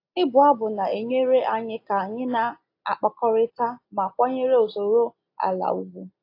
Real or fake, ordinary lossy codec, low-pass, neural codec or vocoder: real; AAC, 32 kbps; 5.4 kHz; none